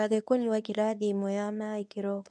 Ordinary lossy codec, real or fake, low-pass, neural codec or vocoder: none; fake; none; codec, 24 kHz, 0.9 kbps, WavTokenizer, medium speech release version 1